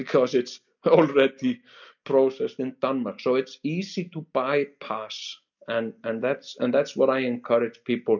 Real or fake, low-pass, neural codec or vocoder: real; 7.2 kHz; none